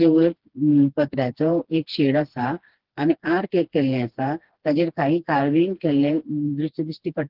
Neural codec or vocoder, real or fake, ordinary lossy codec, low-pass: codec, 16 kHz, 2 kbps, FreqCodec, smaller model; fake; Opus, 16 kbps; 5.4 kHz